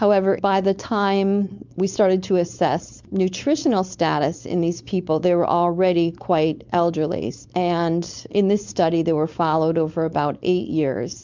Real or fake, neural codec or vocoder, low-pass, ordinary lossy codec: fake; codec, 16 kHz, 4.8 kbps, FACodec; 7.2 kHz; MP3, 64 kbps